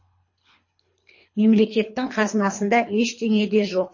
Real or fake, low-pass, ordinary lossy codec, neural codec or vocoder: fake; 7.2 kHz; MP3, 32 kbps; codec, 24 kHz, 3 kbps, HILCodec